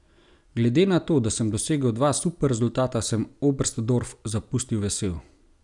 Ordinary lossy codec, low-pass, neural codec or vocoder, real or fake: none; 10.8 kHz; none; real